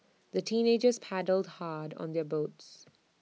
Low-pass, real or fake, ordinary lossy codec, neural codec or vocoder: none; real; none; none